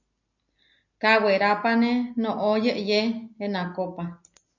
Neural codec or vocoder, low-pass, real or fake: none; 7.2 kHz; real